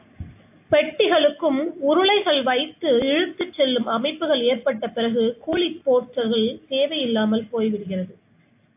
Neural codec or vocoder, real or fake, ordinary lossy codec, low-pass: none; real; AAC, 32 kbps; 3.6 kHz